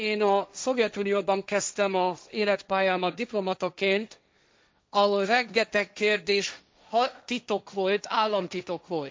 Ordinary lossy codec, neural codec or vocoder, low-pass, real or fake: none; codec, 16 kHz, 1.1 kbps, Voila-Tokenizer; none; fake